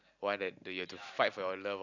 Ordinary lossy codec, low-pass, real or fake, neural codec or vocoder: none; 7.2 kHz; real; none